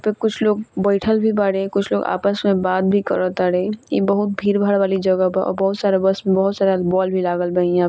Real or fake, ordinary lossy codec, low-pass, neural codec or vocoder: real; none; none; none